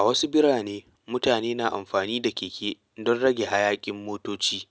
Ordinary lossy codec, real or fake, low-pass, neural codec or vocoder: none; real; none; none